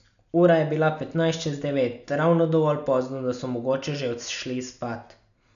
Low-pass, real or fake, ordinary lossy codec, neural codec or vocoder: 7.2 kHz; real; none; none